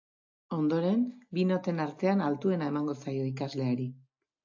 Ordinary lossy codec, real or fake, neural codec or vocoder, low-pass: AAC, 48 kbps; real; none; 7.2 kHz